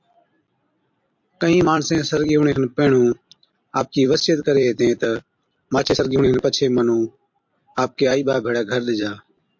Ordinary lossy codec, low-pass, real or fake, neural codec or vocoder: MP3, 48 kbps; 7.2 kHz; fake; vocoder, 44.1 kHz, 128 mel bands every 256 samples, BigVGAN v2